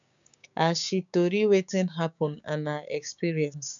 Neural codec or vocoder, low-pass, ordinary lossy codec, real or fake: codec, 16 kHz, 6 kbps, DAC; 7.2 kHz; none; fake